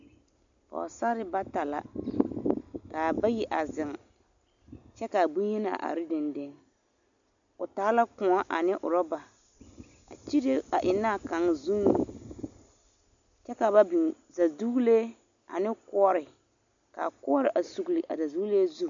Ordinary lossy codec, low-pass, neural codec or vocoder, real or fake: MP3, 96 kbps; 7.2 kHz; none; real